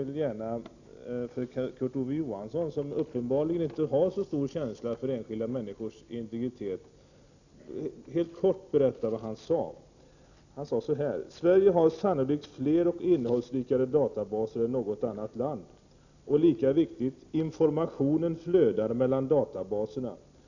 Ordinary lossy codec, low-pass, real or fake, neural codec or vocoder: none; 7.2 kHz; real; none